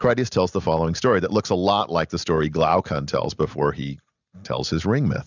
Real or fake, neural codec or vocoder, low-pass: real; none; 7.2 kHz